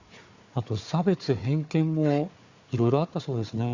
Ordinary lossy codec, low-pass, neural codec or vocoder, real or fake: Opus, 64 kbps; 7.2 kHz; codec, 16 kHz, 4 kbps, FunCodec, trained on Chinese and English, 50 frames a second; fake